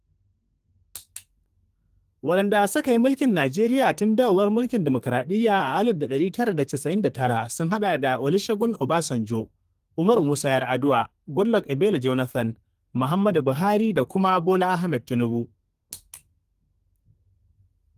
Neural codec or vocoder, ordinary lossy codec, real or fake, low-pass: codec, 32 kHz, 1.9 kbps, SNAC; Opus, 24 kbps; fake; 14.4 kHz